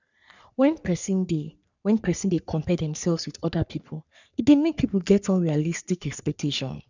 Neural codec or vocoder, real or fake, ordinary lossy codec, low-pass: codec, 44.1 kHz, 3.4 kbps, Pupu-Codec; fake; none; 7.2 kHz